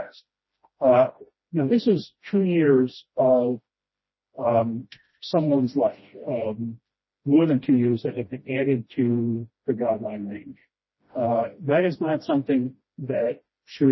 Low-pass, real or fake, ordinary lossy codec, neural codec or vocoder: 7.2 kHz; fake; MP3, 24 kbps; codec, 16 kHz, 1 kbps, FreqCodec, smaller model